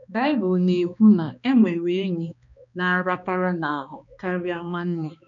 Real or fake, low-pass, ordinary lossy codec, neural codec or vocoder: fake; 7.2 kHz; none; codec, 16 kHz, 2 kbps, X-Codec, HuBERT features, trained on balanced general audio